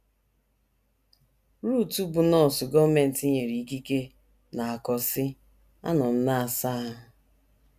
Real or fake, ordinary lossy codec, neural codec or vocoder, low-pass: real; none; none; 14.4 kHz